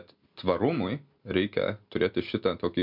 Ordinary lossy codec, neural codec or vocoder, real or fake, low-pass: MP3, 48 kbps; none; real; 5.4 kHz